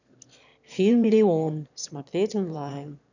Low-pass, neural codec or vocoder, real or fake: 7.2 kHz; autoencoder, 22.05 kHz, a latent of 192 numbers a frame, VITS, trained on one speaker; fake